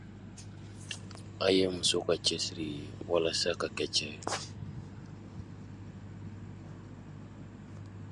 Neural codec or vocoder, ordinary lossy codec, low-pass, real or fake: none; Opus, 64 kbps; 10.8 kHz; real